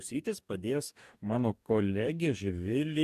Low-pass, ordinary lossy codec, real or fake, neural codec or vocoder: 14.4 kHz; MP3, 96 kbps; fake; codec, 44.1 kHz, 2.6 kbps, DAC